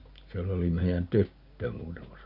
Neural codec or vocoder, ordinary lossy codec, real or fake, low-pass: none; none; real; 5.4 kHz